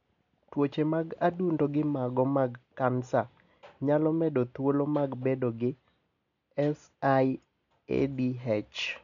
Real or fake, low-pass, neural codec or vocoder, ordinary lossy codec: real; 7.2 kHz; none; none